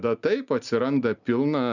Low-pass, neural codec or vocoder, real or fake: 7.2 kHz; none; real